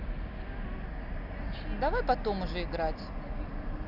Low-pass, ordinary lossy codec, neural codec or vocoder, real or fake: 5.4 kHz; none; none; real